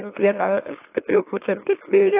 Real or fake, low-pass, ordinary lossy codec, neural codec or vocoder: fake; 3.6 kHz; AAC, 24 kbps; autoencoder, 44.1 kHz, a latent of 192 numbers a frame, MeloTTS